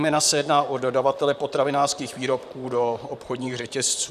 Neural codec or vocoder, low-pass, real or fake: vocoder, 44.1 kHz, 128 mel bands, Pupu-Vocoder; 14.4 kHz; fake